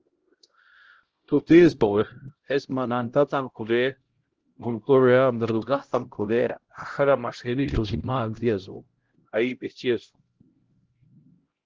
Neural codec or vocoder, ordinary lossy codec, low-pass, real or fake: codec, 16 kHz, 0.5 kbps, X-Codec, HuBERT features, trained on LibriSpeech; Opus, 16 kbps; 7.2 kHz; fake